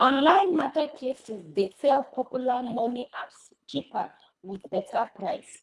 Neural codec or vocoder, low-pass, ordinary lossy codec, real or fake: codec, 24 kHz, 1.5 kbps, HILCodec; none; none; fake